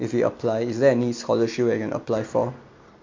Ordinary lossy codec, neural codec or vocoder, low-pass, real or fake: MP3, 48 kbps; none; 7.2 kHz; real